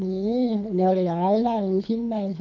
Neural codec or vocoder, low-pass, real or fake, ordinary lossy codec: codec, 24 kHz, 3 kbps, HILCodec; 7.2 kHz; fake; none